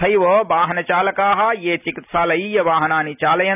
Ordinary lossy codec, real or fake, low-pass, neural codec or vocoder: none; real; 3.6 kHz; none